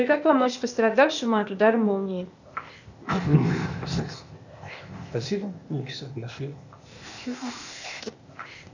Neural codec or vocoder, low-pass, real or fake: codec, 16 kHz, 0.8 kbps, ZipCodec; 7.2 kHz; fake